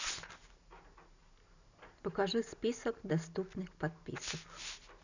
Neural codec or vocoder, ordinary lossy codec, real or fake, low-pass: none; none; real; 7.2 kHz